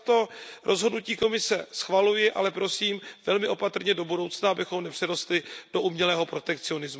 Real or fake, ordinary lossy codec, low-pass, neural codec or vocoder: real; none; none; none